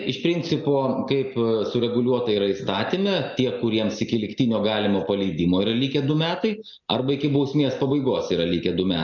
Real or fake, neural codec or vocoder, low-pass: real; none; 7.2 kHz